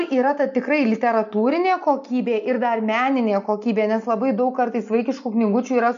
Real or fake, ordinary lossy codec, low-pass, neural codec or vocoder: real; MP3, 64 kbps; 7.2 kHz; none